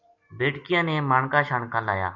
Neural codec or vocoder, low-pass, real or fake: none; 7.2 kHz; real